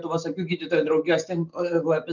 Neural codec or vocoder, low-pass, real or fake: none; 7.2 kHz; real